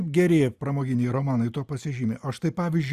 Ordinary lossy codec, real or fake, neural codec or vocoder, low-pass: Opus, 64 kbps; real; none; 14.4 kHz